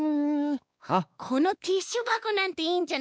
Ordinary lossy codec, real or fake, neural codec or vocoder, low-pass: none; fake; codec, 16 kHz, 2 kbps, X-Codec, WavLM features, trained on Multilingual LibriSpeech; none